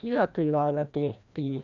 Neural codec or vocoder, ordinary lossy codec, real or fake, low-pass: codec, 16 kHz, 1 kbps, FreqCodec, larger model; none; fake; 7.2 kHz